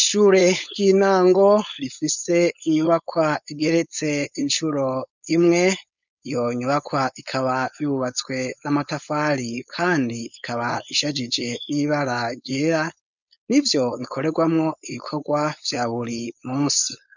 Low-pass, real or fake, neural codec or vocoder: 7.2 kHz; fake; codec, 16 kHz, 4.8 kbps, FACodec